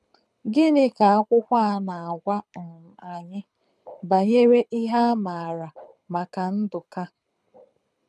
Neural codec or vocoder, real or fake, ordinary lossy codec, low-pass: codec, 24 kHz, 6 kbps, HILCodec; fake; none; none